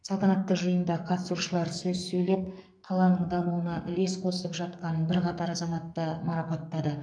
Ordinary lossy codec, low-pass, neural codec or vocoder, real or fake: none; 9.9 kHz; codec, 44.1 kHz, 2.6 kbps, SNAC; fake